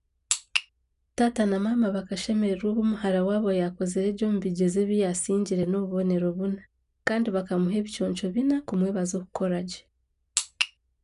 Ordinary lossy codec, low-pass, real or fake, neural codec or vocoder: none; 10.8 kHz; real; none